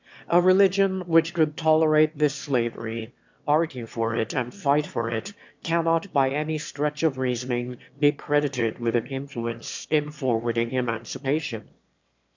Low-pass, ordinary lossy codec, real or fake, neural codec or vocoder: 7.2 kHz; MP3, 64 kbps; fake; autoencoder, 22.05 kHz, a latent of 192 numbers a frame, VITS, trained on one speaker